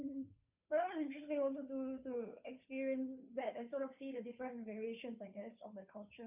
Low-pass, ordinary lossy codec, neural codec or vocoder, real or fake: 3.6 kHz; none; codec, 16 kHz, 2 kbps, FunCodec, trained on Chinese and English, 25 frames a second; fake